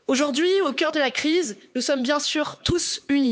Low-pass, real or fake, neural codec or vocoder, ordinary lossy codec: none; fake; codec, 16 kHz, 4 kbps, X-Codec, HuBERT features, trained on LibriSpeech; none